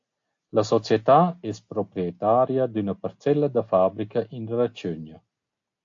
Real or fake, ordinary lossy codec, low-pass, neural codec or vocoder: real; AAC, 48 kbps; 7.2 kHz; none